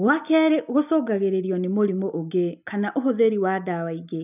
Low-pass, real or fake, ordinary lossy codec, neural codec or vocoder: 3.6 kHz; real; none; none